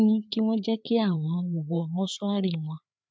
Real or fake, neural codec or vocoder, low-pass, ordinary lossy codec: fake; codec, 16 kHz, 4 kbps, FreqCodec, larger model; none; none